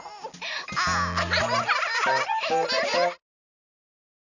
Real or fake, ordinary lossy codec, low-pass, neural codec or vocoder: real; none; 7.2 kHz; none